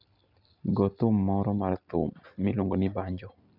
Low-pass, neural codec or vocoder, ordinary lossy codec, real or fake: 5.4 kHz; none; none; real